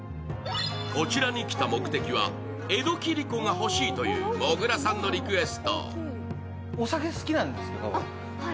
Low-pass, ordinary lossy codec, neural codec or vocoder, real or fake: none; none; none; real